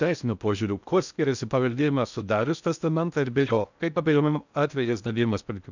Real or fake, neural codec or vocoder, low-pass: fake; codec, 16 kHz in and 24 kHz out, 0.6 kbps, FocalCodec, streaming, 2048 codes; 7.2 kHz